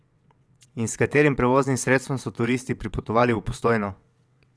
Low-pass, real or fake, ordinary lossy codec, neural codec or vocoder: none; fake; none; vocoder, 22.05 kHz, 80 mel bands, WaveNeXt